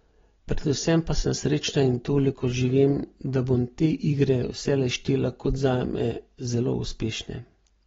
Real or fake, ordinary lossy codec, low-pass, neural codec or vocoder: real; AAC, 24 kbps; 7.2 kHz; none